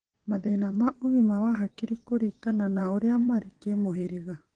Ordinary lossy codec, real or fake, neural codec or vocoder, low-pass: Opus, 16 kbps; fake; vocoder, 22.05 kHz, 80 mel bands, WaveNeXt; 9.9 kHz